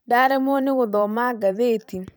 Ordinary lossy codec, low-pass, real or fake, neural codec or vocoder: none; none; fake; vocoder, 44.1 kHz, 128 mel bands every 512 samples, BigVGAN v2